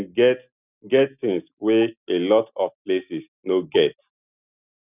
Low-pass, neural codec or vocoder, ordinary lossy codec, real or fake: 3.6 kHz; none; none; real